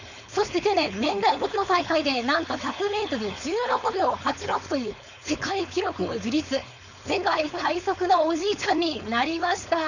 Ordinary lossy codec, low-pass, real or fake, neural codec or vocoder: none; 7.2 kHz; fake; codec, 16 kHz, 4.8 kbps, FACodec